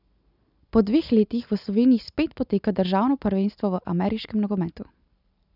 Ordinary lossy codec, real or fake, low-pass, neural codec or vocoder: none; real; 5.4 kHz; none